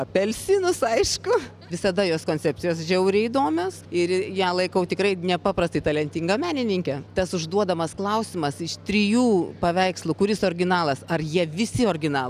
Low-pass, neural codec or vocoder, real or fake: 14.4 kHz; none; real